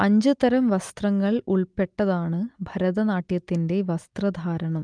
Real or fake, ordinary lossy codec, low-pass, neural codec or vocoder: real; Opus, 32 kbps; 9.9 kHz; none